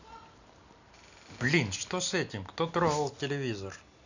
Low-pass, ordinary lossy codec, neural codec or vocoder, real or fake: 7.2 kHz; none; none; real